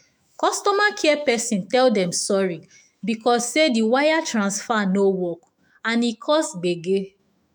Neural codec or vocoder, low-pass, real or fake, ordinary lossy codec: autoencoder, 48 kHz, 128 numbers a frame, DAC-VAE, trained on Japanese speech; none; fake; none